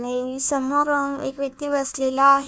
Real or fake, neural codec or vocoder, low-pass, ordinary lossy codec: fake; codec, 16 kHz, 1 kbps, FunCodec, trained on Chinese and English, 50 frames a second; none; none